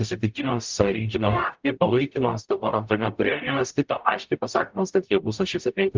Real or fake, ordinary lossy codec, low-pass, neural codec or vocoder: fake; Opus, 32 kbps; 7.2 kHz; codec, 44.1 kHz, 0.9 kbps, DAC